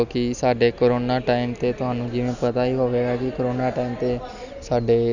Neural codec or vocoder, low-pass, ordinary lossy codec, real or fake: none; 7.2 kHz; none; real